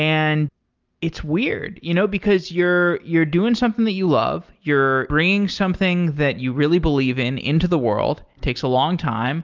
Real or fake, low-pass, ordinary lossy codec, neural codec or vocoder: real; 7.2 kHz; Opus, 24 kbps; none